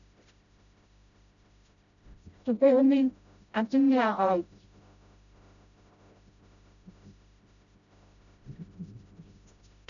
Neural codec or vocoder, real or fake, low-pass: codec, 16 kHz, 0.5 kbps, FreqCodec, smaller model; fake; 7.2 kHz